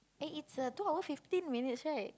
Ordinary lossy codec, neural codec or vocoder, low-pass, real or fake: none; none; none; real